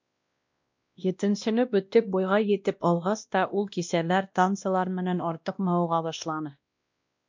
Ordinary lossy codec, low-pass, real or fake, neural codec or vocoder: MP3, 64 kbps; 7.2 kHz; fake; codec, 16 kHz, 1 kbps, X-Codec, WavLM features, trained on Multilingual LibriSpeech